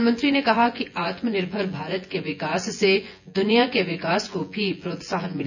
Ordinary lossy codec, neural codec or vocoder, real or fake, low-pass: none; vocoder, 24 kHz, 100 mel bands, Vocos; fake; 7.2 kHz